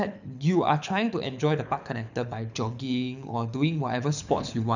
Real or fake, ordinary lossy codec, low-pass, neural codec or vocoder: fake; none; 7.2 kHz; codec, 24 kHz, 6 kbps, HILCodec